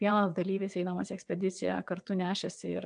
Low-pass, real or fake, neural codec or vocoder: 9.9 kHz; fake; vocoder, 22.05 kHz, 80 mel bands, WaveNeXt